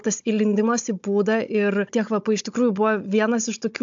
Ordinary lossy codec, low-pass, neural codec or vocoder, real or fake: AAC, 64 kbps; 7.2 kHz; codec, 16 kHz, 16 kbps, FunCodec, trained on Chinese and English, 50 frames a second; fake